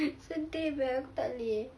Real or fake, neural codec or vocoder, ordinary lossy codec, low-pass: real; none; none; none